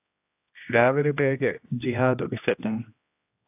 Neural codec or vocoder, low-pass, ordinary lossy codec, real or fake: codec, 16 kHz, 1 kbps, X-Codec, HuBERT features, trained on general audio; 3.6 kHz; AAC, 32 kbps; fake